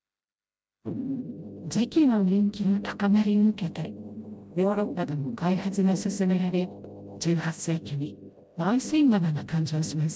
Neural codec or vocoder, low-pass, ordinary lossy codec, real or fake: codec, 16 kHz, 0.5 kbps, FreqCodec, smaller model; none; none; fake